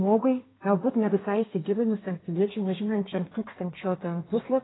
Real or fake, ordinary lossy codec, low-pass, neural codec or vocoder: fake; AAC, 16 kbps; 7.2 kHz; codec, 32 kHz, 1.9 kbps, SNAC